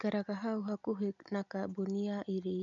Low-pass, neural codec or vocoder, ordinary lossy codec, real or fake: 7.2 kHz; none; none; real